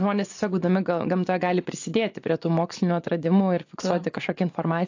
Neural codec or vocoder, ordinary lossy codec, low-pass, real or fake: vocoder, 24 kHz, 100 mel bands, Vocos; AAC, 48 kbps; 7.2 kHz; fake